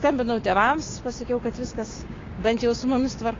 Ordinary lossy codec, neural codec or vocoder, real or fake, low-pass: AAC, 32 kbps; codec, 16 kHz, 6 kbps, DAC; fake; 7.2 kHz